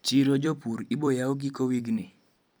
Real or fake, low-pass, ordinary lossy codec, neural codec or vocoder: fake; none; none; vocoder, 44.1 kHz, 128 mel bands every 256 samples, BigVGAN v2